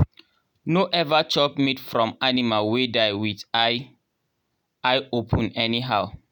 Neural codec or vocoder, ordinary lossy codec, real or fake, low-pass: none; none; real; 19.8 kHz